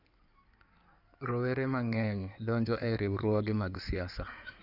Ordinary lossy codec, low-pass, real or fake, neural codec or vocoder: none; 5.4 kHz; fake; codec, 16 kHz in and 24 kHz out, 2.2 kbps, FireRedTTS-2 codec